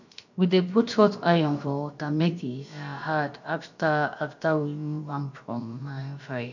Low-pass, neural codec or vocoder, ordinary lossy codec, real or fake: 7.2 kHz; codec, 16 kHz, about 1 kbps, DyCAST, with the encoder's durations; none; fake